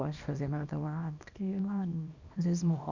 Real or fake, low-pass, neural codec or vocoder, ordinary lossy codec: fake; 7.2 kHz; codec, 24 kHz, 0.9 kbps, WavTokenizer, small release; none